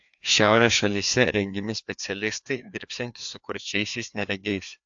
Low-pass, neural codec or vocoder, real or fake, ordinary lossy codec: 7.2 kHz; codec, 16 kHz, 2 kbps, FreqCodec, larger model; fake; AAC, 64 kbps